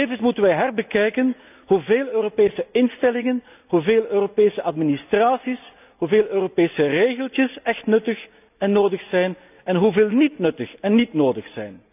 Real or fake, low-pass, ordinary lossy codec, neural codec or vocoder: real; 3.6 kHz; none; none